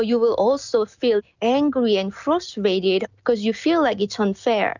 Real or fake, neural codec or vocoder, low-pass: real; none; 7.2 kHz